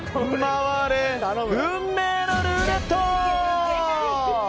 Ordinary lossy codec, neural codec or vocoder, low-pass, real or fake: none; none; none; real